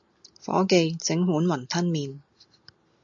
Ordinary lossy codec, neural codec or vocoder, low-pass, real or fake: AAC, 64 kbps; none; 7.2 kHz; real